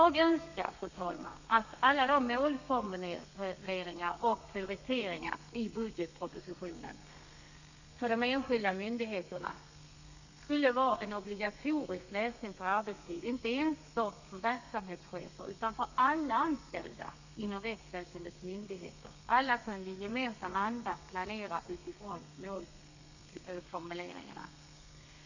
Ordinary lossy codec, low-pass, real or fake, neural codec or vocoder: none; 7.2 kHz; fake; codec, 32 kHz, 1.9 kbps, SNAC